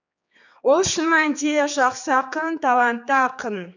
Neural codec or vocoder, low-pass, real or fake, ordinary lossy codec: codec, 16 kHz, 4 kbps, X-Codec, HuBERT features, trained on general audio; 7.2 kHz; fake; none